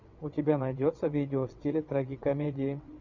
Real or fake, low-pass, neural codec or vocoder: fake; 7.2 kHz; vocoder, 22.05 kHz, 80 mel bands, WaveNeXt